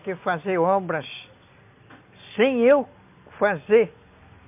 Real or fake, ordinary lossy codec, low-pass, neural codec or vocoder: real; MP3, 32 kbps; 3.6 kHz; none